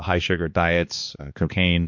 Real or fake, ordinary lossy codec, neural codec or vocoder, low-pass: fake; MP3, 48 kbps; codec, 16 kHz, 2 kbps, X-Codec, HuBERT features, trained on balanced general audio; 7.2 kHz